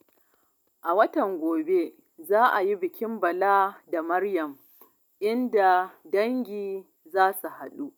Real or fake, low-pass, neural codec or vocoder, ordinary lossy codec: real; 19.8 kHz; none; none